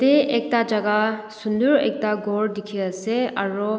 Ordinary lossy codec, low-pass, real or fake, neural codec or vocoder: none; none; real; none